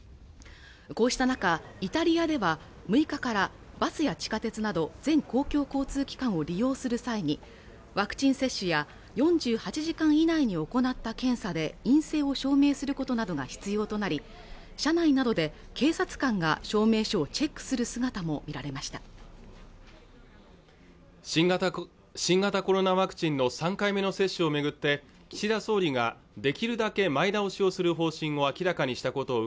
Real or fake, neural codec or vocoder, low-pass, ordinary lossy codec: real; none; none; none